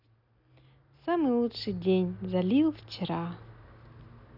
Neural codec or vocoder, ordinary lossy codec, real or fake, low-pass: none; none; real; 5.4 kHz